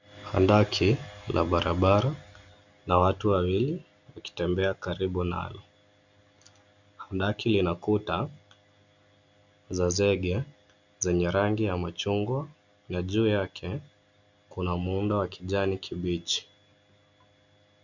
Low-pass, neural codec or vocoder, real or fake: 7.2 kHz; none; real